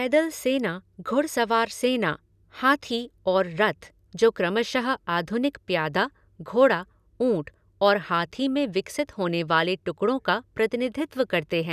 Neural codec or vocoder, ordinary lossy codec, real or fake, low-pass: none; none; real; 14.4 kHz